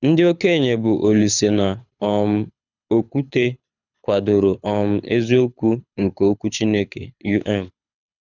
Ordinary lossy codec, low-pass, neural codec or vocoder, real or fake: none; 7.2 kHz; codec, 24 kHz, 6 kbps, HILCodec; fake